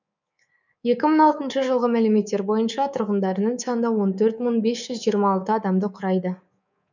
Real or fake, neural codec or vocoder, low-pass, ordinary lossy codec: fake; codec, 24 kHz, 3.1 kbps, DualCodec; 7.2 kHz; none